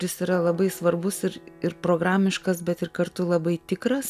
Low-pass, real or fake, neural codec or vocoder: 14.4 kHz; real; none